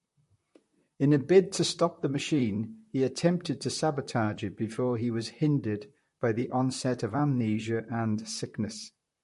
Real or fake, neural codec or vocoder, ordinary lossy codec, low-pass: fake; vocoder, 44.1 kHz, 128 mel bands, Pupu-Vocoder; MP3, 48 kbps; 14.4 kHz